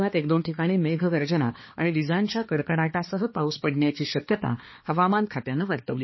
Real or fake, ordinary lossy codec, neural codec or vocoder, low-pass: fake; MP3, 24 kbps; codec, 16 kHz, 2 kbps, X-Codec, HuBERT features, trained on balanced general audio; 7.2 kHz